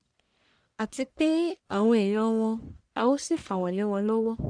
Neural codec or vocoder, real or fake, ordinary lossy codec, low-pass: codec, 44.1 kHz, 1.7 kbps, Pupu-Codec; fake; Opus, 64 kbps; 9.9 kHz